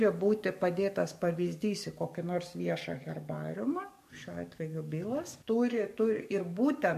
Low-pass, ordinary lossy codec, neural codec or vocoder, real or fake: 14.4 kHz; MP3, 64 kbps; codec, 44.1 kHz, 7.8 kbps, DAC; fake